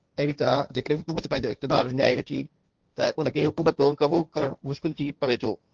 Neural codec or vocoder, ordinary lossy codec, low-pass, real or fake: codec, 16 kHz, 1.1 kbps, Voila-Tokenizer; Opus, 24 kbps; 7.2 kHz; fake